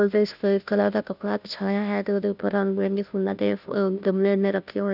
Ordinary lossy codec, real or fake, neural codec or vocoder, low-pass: none; fake; codec, 16 kHz, 0.5 kbps, FunCodec, trained on Chinese and English, 25 frames a second; 5.4 kHz